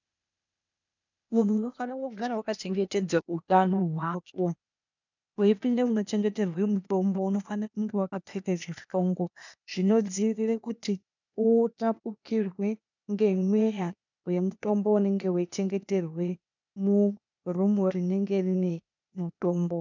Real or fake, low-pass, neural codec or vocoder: fake; 7.2 kHz; codec, 16 kHz, 0.8 kbps, ZipCodec